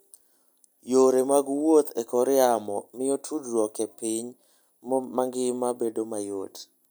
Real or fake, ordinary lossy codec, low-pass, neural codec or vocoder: real; none; none; none